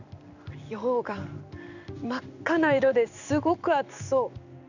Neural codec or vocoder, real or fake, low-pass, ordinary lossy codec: codec, 16 kHz in and 24 kHz out, 1 kbps, XY-Tokenizer; fake; 7.2 kHz; none